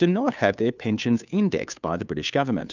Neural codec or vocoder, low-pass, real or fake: codec, 16 kHz, 2 kbps, FunCodec, trained on Chinese and English, 25 frames a second; 7.2 kHz; fake